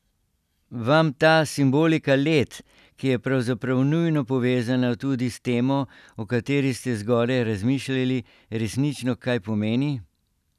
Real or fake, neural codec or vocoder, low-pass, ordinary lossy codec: real; none; 14.4 kHz; none